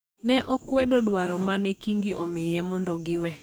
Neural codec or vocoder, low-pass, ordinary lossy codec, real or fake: codec, 44.1 kHz, 2.6 kbps, DAC; none; none; fake